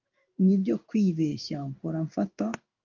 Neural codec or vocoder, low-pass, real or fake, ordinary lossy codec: codec, 16 kHz in and 24 kHz out, 1 kbps, XY-Tokenizer; 7.2 kHz; fake; Opus, 24 kbps